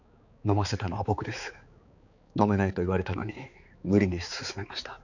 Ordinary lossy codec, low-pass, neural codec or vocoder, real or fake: none; 7.2 kHz; codec, 16 kHz, 4 kbps, X-Codec, HuBERT features, trained on balanced general audio; fake